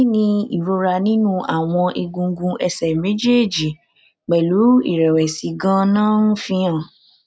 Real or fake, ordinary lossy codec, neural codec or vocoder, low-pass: real; none; none; none